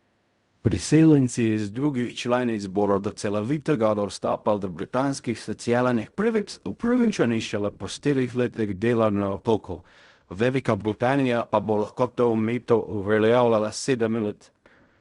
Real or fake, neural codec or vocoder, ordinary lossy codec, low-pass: fake; codec, 16 kHz in and 24 kHz out, 0.4 kbps, LongCat-Audio-Codec, fine tuned four codebook decoder; Opus, 64 kbps; 10.8 kHz